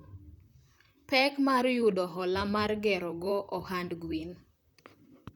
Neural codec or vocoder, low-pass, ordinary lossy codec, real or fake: vocoder, 44.1 kHz, 128 mel bands, Pupu-Vocoder; none; none; fake